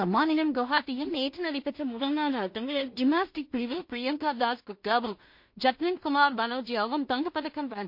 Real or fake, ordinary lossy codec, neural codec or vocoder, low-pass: fake; MP3, 32 kbps; codec, 16 kHz in and 24 kHz out, 0.4 kbps, LongCat-Audio-Codec, two codebook decoder; 5.4 kHz